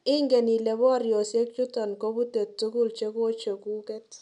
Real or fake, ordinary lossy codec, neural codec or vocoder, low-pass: real; MP3, 96 kbps; none; 10.8 kHz